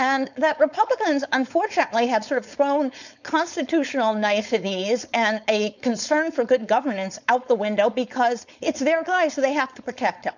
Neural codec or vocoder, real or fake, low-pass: codec, 16 kHz, 4.8 kbps, FACodec; fake; 7.2 kHz